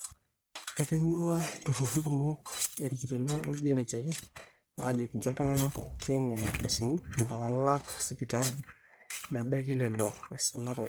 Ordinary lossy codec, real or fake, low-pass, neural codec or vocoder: none; fake; none; codec, 44.1 kHz, 1.7 kbps, Pupu-Codec